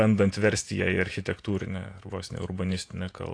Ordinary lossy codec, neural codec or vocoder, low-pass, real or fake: AAC, 48 kbps; vocoder, 48 kHz, 128 mel bands, Vocos; 9.9 kHz; fake